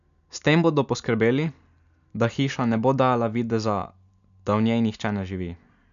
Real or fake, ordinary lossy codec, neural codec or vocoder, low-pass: real; none; none; 7.2 kHz